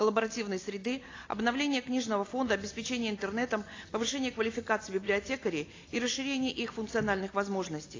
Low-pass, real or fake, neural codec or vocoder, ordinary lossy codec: 7.2 kHz; real; none; AAC, 32 kbps